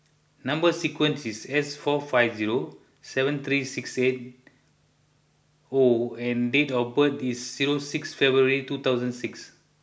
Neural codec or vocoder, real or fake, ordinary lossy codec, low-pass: none; real; none; none